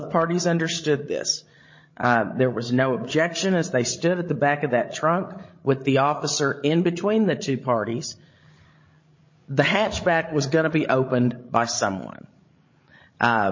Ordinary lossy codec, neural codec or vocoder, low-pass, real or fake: MP3, 32 kbps; codec, 16 kHz, 16 kbps, FreqCodec, larger model; 7.2 kHz; fake